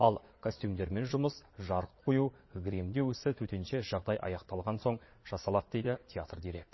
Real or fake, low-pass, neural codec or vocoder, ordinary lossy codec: fake; 7.2 kHz; vocoder, 22.05 kHz, 80 mel bands, Vocos; MP3, 24 kbps